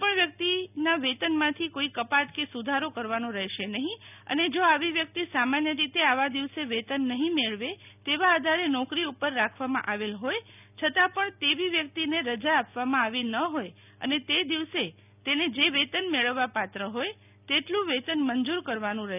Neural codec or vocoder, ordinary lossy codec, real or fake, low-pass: none; none; real; 3.6 kHz